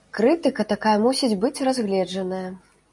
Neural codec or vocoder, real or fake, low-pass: none; real; 10.8 kHz